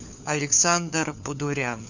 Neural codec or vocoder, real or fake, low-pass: codec, 16 kHz, 4 kbps, FunCodec, trained on LibriTTS, 50 frames a second; fake; 7.2 kHz